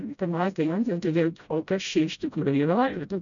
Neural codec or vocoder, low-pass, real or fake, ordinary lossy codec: codec, 16 kHz, 0.5 kbps, FreqCodec, smaller model; 7.2 kHz; fake; MP3, 96 kbps